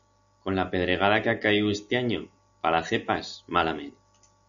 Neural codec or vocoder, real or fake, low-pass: none; real; 7.2 kHz